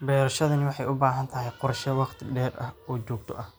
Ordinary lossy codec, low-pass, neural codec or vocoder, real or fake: none; none; none; real